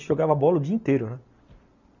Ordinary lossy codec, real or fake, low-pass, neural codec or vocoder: none; real; 7.2 kHz; none